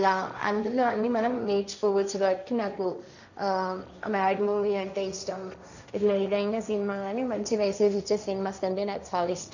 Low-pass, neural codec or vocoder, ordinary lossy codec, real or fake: 7.2 kHz; codec, 16 kHz, 1.1 kbps, Voila-Tokenizer; none; fake